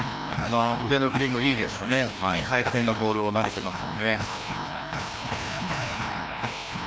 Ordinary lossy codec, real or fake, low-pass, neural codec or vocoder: none; fake; none; codec, 16 kHz, 1 kbps, FreqCodec, larger model